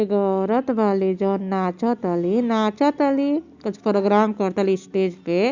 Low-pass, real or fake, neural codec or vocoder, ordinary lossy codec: 7.2 kHz; real; none; Opus, 64 kbps